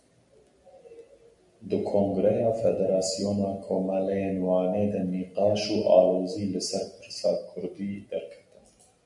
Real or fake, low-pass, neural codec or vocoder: real; 10.8 kHz; none